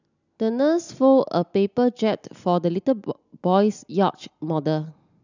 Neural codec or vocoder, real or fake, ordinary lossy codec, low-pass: none; real; none; 7.2 kHz